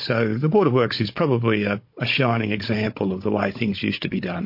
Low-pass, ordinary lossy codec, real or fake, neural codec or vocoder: 5.4 kHz; MP3, 32 kbps; fake; codec, 16 kHz, 16 kbps, FunCodec, trained on Chinese and English, 50 frames a second